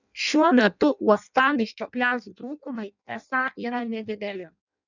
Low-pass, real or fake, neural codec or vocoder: 7.2 kHz; fake; codec, 16 kHz in and 24 kHz out, 0.6 kbps, FireRedTTS-2 codec